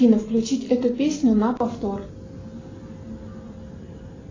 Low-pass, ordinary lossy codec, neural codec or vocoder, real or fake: 7.2 kHz; AAC, 32 kbps; none; real